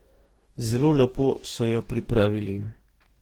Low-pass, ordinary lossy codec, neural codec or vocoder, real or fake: 19.8 kHz; Opus, 16 kbps; codec, 44.1 kHz, 2.6 kbps, DAC; fake